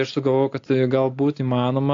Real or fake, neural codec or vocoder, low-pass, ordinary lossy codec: real; none; 7.2 kHz; AAC, 48 kbps